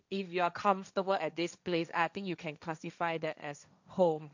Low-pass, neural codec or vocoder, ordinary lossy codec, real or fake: 7.2 kHz; codec, 16 kHz, 1.1 kbps, Voila-Tokenizer; none; fake